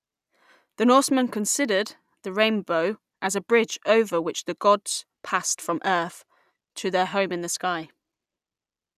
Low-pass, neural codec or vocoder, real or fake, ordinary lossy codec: 14.4 kHz; none; real; none